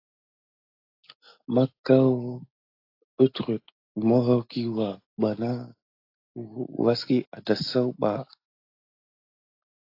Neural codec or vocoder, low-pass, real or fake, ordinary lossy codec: none; 5.4 kHz; real; AAC, 32 kbps